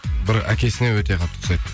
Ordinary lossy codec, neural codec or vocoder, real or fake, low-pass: none; none; real; none